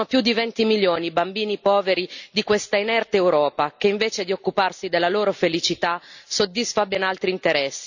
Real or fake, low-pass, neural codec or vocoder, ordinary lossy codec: real; 7.2 kHz; none; none